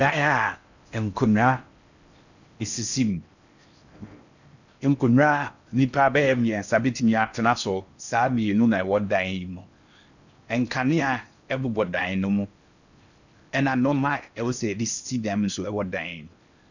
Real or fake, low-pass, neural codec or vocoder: fake; 7.2 kHz; codec, 16 kHz in and 24 kHz out, 0.6 kbps, FocalCodec, streaming, 4096 codes